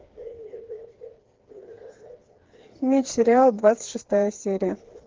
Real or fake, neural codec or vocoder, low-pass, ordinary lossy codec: fake; codec, 16 kHz, 4 kbps, FreqCodec, smaller model; 7.2 kHz; Opus, 16 kbps